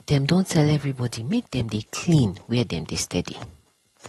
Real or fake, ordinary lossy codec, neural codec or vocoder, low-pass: real; AAC, 32 kbps; none; 19.8 kHz